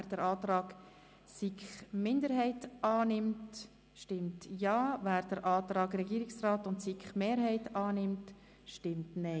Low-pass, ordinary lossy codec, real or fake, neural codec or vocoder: none; none; real; none